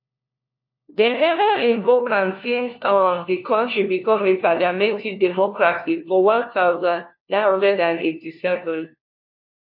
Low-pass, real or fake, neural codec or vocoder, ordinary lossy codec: 5.4 kHz; fake; codec, 16 kHz, 1 kbps, FunCodec, trained on LibriTTS, 50 frames a second; MP3, 32 kbps